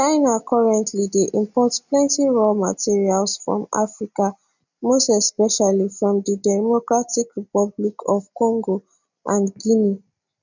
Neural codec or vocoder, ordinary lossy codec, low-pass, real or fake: none; none; 7.2 kHz; real